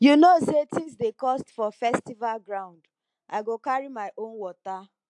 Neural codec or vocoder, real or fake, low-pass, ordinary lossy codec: none; real; 10.8 kHz; AAC, 64 kbps